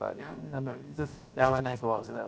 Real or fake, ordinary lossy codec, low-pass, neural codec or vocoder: fake; none; none; codec, 16 kHz, about 1 kbps, DyCAST, with the encoder's durations